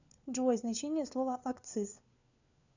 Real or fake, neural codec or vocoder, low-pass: fake; codec, 16 kHz, 4 kbps, FunCodec, trained on LibriTTS, 50 frames a second; 7.2 kHz